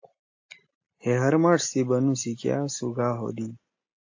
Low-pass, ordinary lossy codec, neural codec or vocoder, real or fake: 7.2 kHz; AAC, 48 kbps; none; real